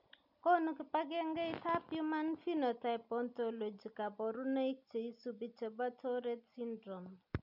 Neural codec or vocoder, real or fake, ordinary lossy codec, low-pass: none; real; none; 5.4 kHz